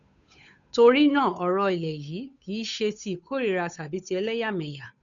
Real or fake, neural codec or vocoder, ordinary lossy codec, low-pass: fake; codec, 16 kHz, 8 kbps, FunCodec, trained on Chinese and English, 25 frames a second; MP3, 96 kbps; 7.2 kHz